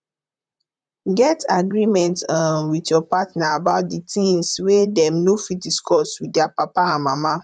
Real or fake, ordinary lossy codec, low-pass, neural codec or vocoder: fake; none; 9.9 kHz; vocoder, 44.1 kHz, 128 mel bands, Pupu-Vocoder